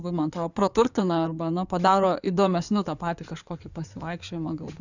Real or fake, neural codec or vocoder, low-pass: fake; codec, 16 kHz in and 24 kHz out, 2.2 kbps, FireRedTTS-2 codec; 7.2 kHz